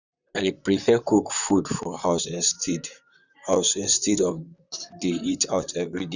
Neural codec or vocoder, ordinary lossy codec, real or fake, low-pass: vocoder, 44.1 kHz, 128 mel bands, Pupu-Vocoder; none; fake; 7.2 kHz